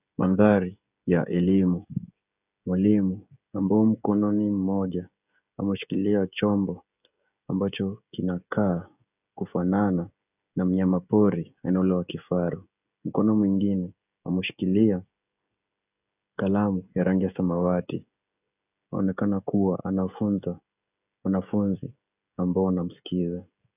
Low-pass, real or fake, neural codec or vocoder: 3.6 kHz; fake; codec, 16 kHz, 16 kbps, FreqCodec, smaller model